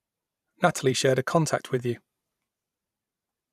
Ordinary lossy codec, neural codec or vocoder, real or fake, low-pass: none; vocoder, 44.1 kHz, 128 mel bands every 512 samples, BigVGAN v2; fake; 14.4 kHz